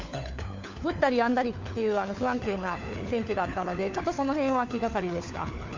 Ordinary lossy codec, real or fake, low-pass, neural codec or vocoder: MP3, 64 kbps; fake; 7.2 kHz; codec, 16 kHz, 4 kbps, FunCodec, trained on LibriTTS, 50 frames a second